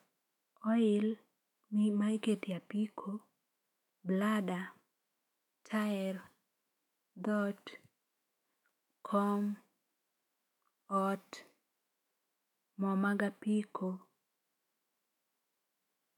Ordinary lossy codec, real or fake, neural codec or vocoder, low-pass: MP3, 96 kbps; fake; autoencoder, 48 kHz, 128 numbers a frame, DAC-VAE, trained on Japanese speech; 19.8 kHz